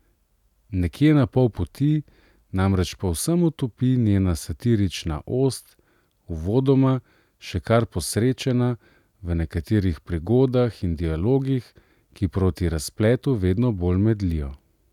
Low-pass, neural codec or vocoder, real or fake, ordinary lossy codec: 19.8 kHz; none; real; none